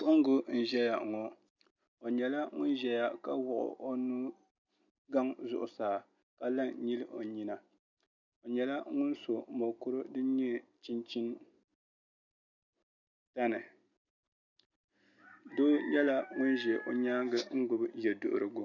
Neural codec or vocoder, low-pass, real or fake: none; 7.2 kHz; real